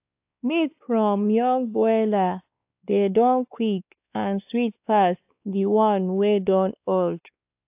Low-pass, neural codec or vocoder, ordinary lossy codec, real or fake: 3.6 kHz; codec, 16 kHz, 2 kbps, X-Codec, WavLM features, trained on Multilingual LibriSpeech; AAC, 32 kbps; fake